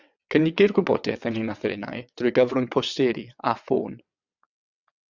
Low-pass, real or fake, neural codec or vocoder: 7.2 kHz; fake; vocoder, 22.05 kHz, 80 mel bands, WaveNeXt